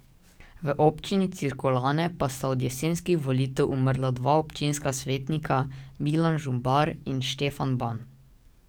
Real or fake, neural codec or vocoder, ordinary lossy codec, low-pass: fake; codec, 44.1 kHz, 7.8 kbps, DAC; none; none